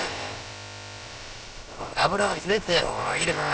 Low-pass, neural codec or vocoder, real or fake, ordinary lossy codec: none; codec, 16 kHz, about 1 kbps, DyCAST, with the encoder's durations; fake; none